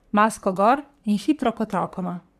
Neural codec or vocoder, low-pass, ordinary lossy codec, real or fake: codec, 44.1 kHz, 3.4 kbps, Pupu-Codec; 14.4 kHz; none; fake